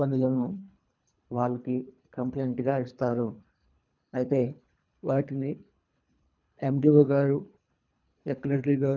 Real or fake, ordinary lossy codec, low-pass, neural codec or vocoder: fake; none; 7.2 kHz; codec, 24 kHz, 3 kbps, HILCodec